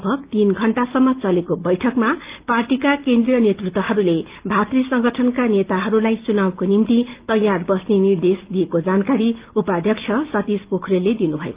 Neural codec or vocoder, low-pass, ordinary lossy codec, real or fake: none; 3.6 kHz; Opus, 32 kbps; real